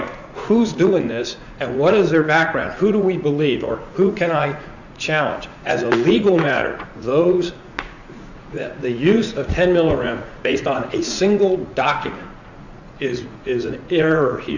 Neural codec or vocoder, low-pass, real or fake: vocoder, 44.1 kHz, 80 mel bands, Vocos; 7.2 kHz; fake